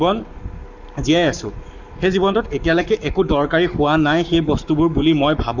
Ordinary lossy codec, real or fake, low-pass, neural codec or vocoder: none; fake; 7.2 kHz; codec, 44.1 kHz, 7.8 kbps, Pupu-Codec